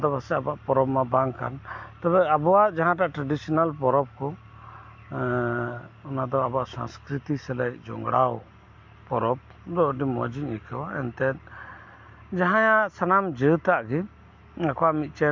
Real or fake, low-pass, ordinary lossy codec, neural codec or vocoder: real; 7.2 kHz; MP3, 48 kbps; none